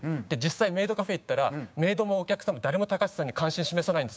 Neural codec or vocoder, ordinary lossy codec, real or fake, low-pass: codec, 16 kHz, 6 kbps, DAC; none; fake; none